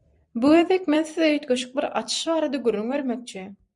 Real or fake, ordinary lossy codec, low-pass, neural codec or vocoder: fake; MP3, 96 kbps; 10.8 kHz; vocoder, 44.1 kHz, 128 mel bands every 256 samples, BigVGAN v2